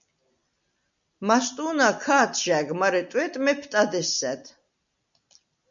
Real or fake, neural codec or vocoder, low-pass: real; none; 7.2 kHz